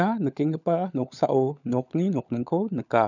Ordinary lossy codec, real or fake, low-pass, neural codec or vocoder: none; fake; 7.2 kHz; codec, 16 kHz, 16 kbps, FunCodec, trained on LibriTTS, 50 frames a second